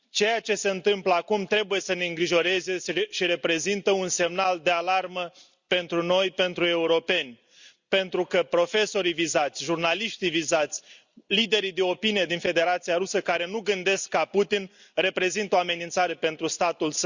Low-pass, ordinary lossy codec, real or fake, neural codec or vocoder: 7.2 kHz; Opus, 64 kbps; real; none